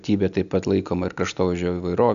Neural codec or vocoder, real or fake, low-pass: none; real; 7.2 kHz